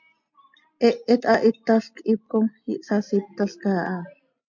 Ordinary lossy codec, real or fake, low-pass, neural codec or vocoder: MP3, 48 kbps; real; 7.2 kHz; none